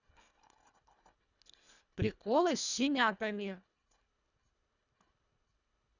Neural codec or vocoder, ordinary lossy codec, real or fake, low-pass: codec, 24 kHz, 1.5 kbps, HILCodec; none; fake; 7.2 kHz